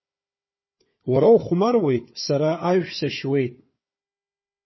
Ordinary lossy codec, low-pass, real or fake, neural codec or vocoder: MP3, 24 kbps; 7.2 kHz; fake; codec, 16 kHz, 4 kbps, FunCodec, trained on Chinese and English, 50 frames a second